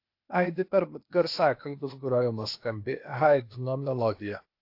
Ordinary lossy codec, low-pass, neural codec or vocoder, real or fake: AAC, 32 kbps; 5.4 kHz; codec, 16 kHz, 0.8 kbps, ZipCodec; fake